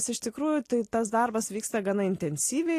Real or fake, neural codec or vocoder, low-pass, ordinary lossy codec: real; none; 14.4 kHz; AAC, 48 kbps